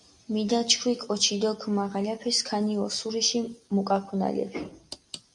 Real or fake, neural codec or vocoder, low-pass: real; none; 10.8 kHz